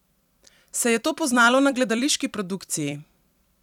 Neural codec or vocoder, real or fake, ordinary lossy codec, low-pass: vocoder, 44.1 kHz, 128 mel bands every 256 samples, BigVGAN v2; fake; none; 19.8 kHz